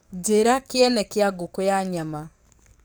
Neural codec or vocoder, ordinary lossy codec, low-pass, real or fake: codec, 44.1 kHz, 7.8 kbps, DAC; none; none; fake